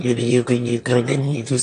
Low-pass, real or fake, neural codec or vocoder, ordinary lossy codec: 9.9 kHz; fake; autoencoder, 22.05 kHz, a latent of 192 numbers a frame, VITS, trained on one speaker; AAC, 64 kbps